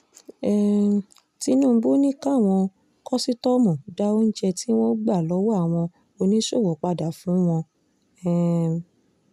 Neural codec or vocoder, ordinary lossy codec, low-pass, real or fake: none; none; 14.4 kHz; real